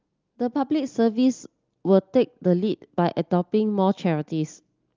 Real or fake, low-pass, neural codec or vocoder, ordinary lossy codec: real; 7.2 kHz; none; Opus, 32 kbps